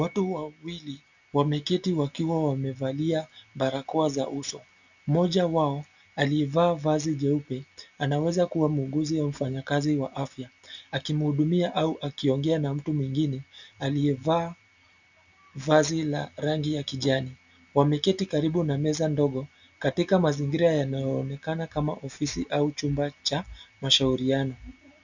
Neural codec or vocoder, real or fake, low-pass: none; real; 7.2 kHz